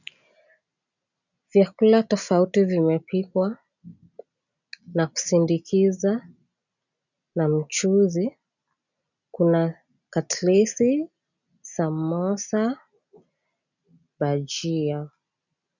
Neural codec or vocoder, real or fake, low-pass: none; real; 7.2 kHz